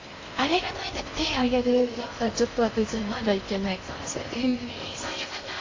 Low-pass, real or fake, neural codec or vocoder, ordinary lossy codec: 7.2 kHz; fake; codec, 16 kHz in and 24 kHz out, 0.6 kbps, FocalCodec, streaming, 4096 codes; AAC, 32 kbps